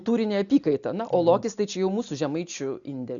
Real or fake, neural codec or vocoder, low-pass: real; none; 7.2 kHz